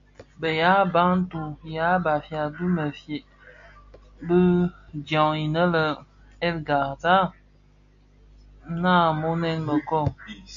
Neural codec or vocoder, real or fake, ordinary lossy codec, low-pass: none; real; AAC, 64 kbps; 7.2 kHz